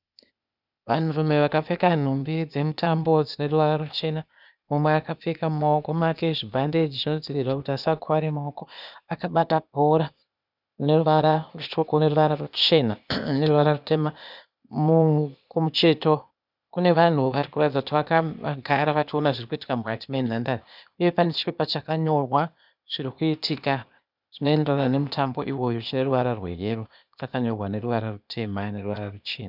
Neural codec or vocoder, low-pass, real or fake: codec, 16 kHz, 0.8 kbps, ZipCodec; 5.4 kHz; fake